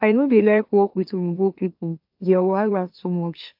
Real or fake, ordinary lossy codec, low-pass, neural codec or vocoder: fake; AAC, 48 kbps; 5.4 kHz; autoencoder, 44.1 kHz, a latent of 192 numbers a frame, MeloTTS